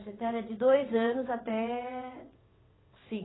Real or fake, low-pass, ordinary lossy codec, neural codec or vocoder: fake; 7.2 kHz; AAC, 16 kbps; vocoder, 22.05 kHz, 80 mel bands, WaveNeXt